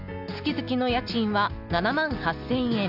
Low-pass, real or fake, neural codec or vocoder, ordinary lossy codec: 5.4 kHz; real; none; none